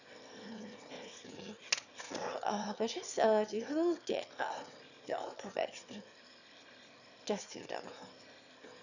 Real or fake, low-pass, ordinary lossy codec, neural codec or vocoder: fake; 7.2 kHz; none; autoencoder, 22.05 kHz, a latent of 192 numbers a frame, VITS, trained on one speaker